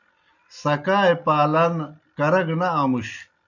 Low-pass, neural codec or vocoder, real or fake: 7.2 kHz; none; real